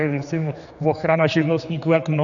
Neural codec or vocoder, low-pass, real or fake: codec, 16 kHz, 4 kbps, X-Codec, HuBERT features, trained on general audio; 7.2 kHz; fake